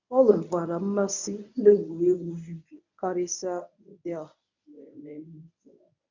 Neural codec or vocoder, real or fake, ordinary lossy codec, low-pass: codec, 24 kHz, 0.9 kbps, WavTokenizer, medium speech release version 1; fake; none; 7.2 kHz